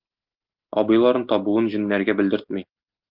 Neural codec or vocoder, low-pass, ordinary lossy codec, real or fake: none; 5.4 kHz; Opus, 32 kbps; real